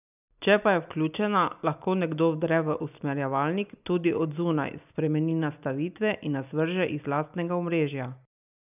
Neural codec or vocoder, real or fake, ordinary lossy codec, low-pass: none; real; none; 3.6 kHz